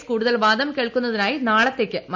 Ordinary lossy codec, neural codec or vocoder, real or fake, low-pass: MP3, 64 kbps; none; real; 7.2 kHz